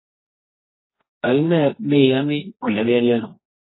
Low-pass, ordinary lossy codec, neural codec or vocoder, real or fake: 7.2 kHz; AAC, 16 kbps; codec, 24 kHz, 0.9 kbps, WavTokenizer, medium music audio release; fake